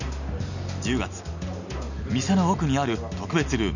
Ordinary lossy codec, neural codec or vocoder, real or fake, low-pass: none; none; real; 7.2 kHz